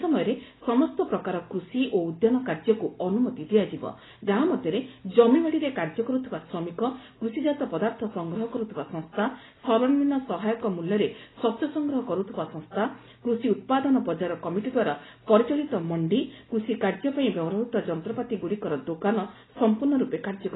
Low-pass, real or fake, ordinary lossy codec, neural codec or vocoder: 7.2 kHz; real; AAC, 16 kbps; none